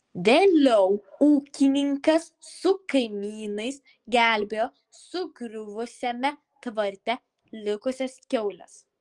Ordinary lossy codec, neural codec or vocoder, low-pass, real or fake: Opus, 24 kbps; codec, 44.1 kHz, 7.8 kbps, DAC; 10.8 kHz; fake